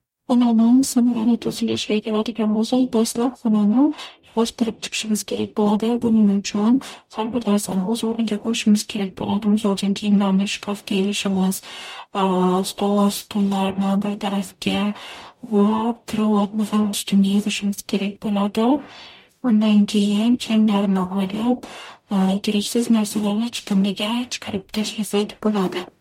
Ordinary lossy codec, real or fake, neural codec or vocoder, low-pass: MP3, 64 kbps; fake; codec, 44.1 kHz, 0.9 kbps, DAC; 19.8 kHz